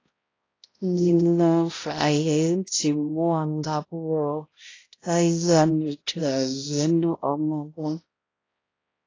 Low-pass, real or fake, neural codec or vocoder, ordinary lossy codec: 7.2 kHz; fake; codec, 16 kHz, 0.5 kbps, X-Codec, HuBERT features, trained on balanced general audio; AAC, 32 kbps